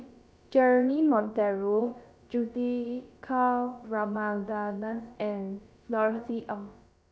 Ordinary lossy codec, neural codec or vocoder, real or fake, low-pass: none; codec, 16 kHz, about 1 kbps, DyCAST, with the encoder's durations; fake; none